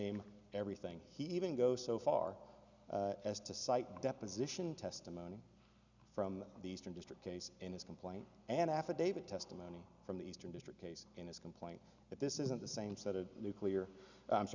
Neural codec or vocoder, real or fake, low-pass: none; real; 7.2 kHz